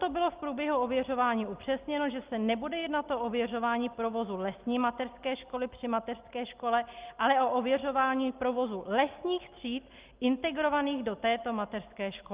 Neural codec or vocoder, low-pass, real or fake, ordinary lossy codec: none; 3.6 kHz; real; Opus, 32 kbps